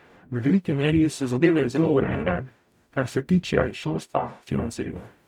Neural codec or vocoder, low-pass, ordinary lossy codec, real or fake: codec, 44.1 kHz, 0.9 kbps, DAC; 19.8 kHz; none; fake